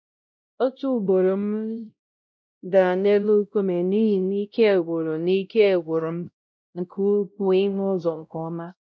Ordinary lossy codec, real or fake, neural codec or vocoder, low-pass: none; fake; codec, 16 kHz, 0.5 kbps, X-Codec, WavLM features, trained on Multilingual LibriSpeech; none